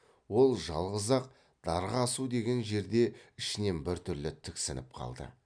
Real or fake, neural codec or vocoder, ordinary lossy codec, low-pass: real; none; none; 9.9 kHz